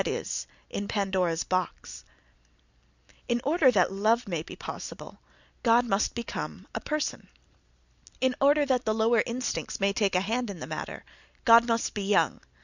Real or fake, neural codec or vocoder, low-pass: real; none; 7.2 kHz